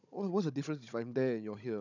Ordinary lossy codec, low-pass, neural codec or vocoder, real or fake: none; 7.2 kHz; codec, 16 kHz, 16 kbps, FunCodec, trained on Chinese and English, 50 frames a second; fake